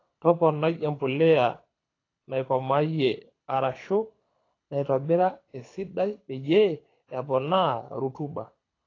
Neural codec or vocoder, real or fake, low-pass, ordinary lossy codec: codec, 24 kHz, 6 kbps, HILCodec; fake; 7.2 kHz; AAC, 32 kbps